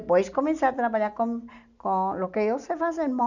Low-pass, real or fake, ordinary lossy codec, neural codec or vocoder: 7.2 kHz; real; none; none